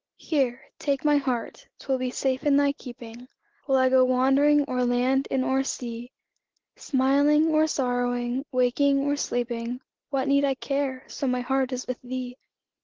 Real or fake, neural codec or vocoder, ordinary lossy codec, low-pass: real; none; Opus, 16 kbps; 7.2 kHz